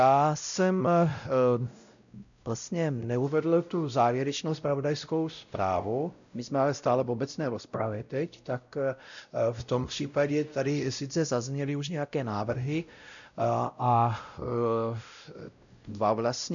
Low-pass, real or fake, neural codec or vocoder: 7.2 kHz; fake; codec, 16 kHz, 0.5 kbps, X-Codec, WavLM features, trained on Multilingual LibriSpeech